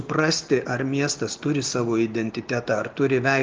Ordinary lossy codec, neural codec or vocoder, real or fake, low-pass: Opus, 24 kbps; none; real; 7.2 kHz